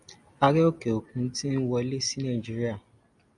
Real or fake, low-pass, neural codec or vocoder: real; 10.8 kHz; none